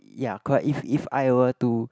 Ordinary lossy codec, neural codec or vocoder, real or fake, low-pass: none; none; real; none